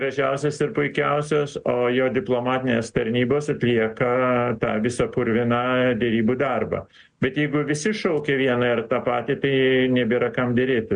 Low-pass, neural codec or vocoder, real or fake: 9.9 kHz; none; real